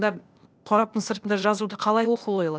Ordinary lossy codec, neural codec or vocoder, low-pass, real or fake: none; codec, 16 kHz, 0.8 kbps, ZipCodec; none; fake